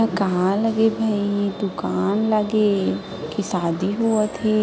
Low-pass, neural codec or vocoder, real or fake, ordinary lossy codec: none; none; real; none